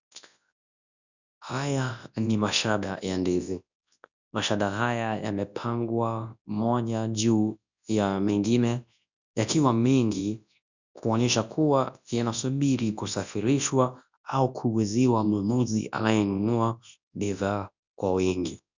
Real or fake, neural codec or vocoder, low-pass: fake; codec, 24 kHz, 0.9 kbps, WavTokenizer, large speech release; 7.2 kHz